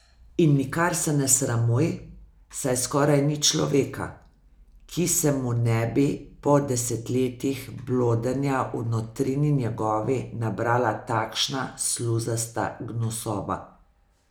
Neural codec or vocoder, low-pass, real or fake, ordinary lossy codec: none; none; real; none